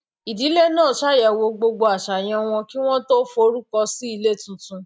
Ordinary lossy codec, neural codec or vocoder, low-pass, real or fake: none; none; none; real